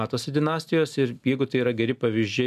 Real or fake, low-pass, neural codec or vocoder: real; 14.4 kHz; none